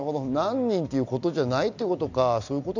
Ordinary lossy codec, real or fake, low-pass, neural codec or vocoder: none; real; 7.2 kHz; none